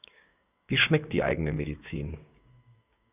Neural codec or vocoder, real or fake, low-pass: codec, 16 kHz, 6 kbps, DAC; fake; 3.6 kHz